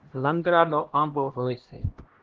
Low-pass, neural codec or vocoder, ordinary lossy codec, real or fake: 7.2 kHz; codec, 16 kHz, 1 kbps, X-Codec, HuBERT features, trained on LibriSpeech; Opus, 16 kbps; fake